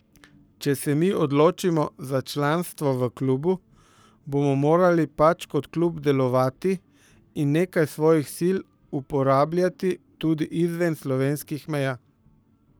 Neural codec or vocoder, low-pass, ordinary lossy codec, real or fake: codec, 44.1 kHz, 7.8 kbps, Pupu-Codec; none; none; fake